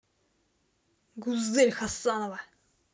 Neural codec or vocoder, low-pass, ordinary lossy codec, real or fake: none; none; none; real